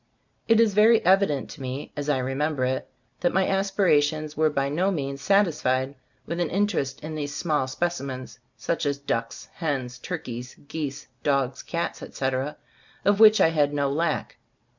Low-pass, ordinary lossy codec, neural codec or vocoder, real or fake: 7.2 kHz; MP3, 64 kbps; none; real